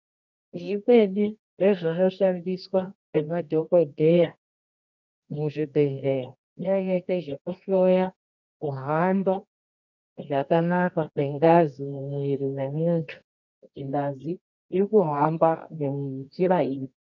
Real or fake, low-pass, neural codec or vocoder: fake; 7.2 kHz; codec, 24 kHz, 0.9 kbps, WavTokenizer, medium music audio release